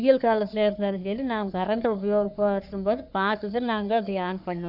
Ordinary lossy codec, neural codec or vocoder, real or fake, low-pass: none; codec, 44.1 kHz, 3.4 kbps, Pupu-Codec; fake; 5.4 kHz